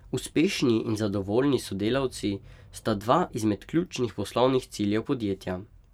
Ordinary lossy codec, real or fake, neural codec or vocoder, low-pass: none; fake; vocoder, 44.1 kHz, 128 mel bands every 512 samples, BigVGAN v2; 19.8 kHz